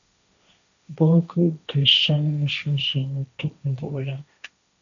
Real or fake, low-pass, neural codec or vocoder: fake; 7.2 kHz; codec, 16 kHz, 1.1 kbps, Voila-Tokenizer